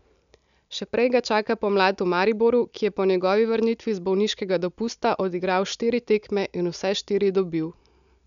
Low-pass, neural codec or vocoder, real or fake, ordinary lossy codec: 7.2 kHz; none; real; none